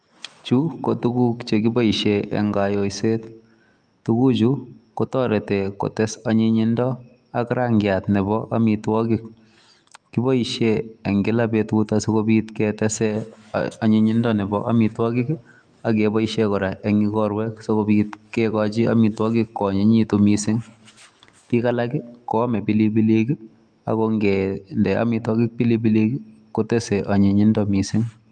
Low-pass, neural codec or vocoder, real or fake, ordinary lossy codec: 9.9 kHz; autoencoder, 48 kHz, 128 numbers a frame, DAC-VAE, trained on Japanese speech; fake; Opus, 32 kbps